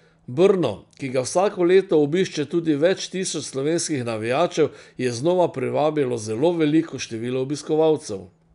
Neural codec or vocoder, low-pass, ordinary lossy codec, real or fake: none; 10.8 kHz; none; real